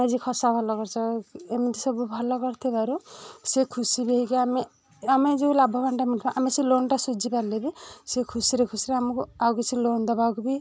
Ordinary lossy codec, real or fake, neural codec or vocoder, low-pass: none; real; none; none